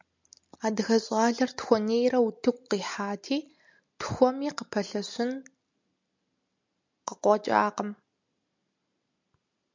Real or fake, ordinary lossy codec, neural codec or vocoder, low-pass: real; MP3, 64 kbps; none; 7.2 kHz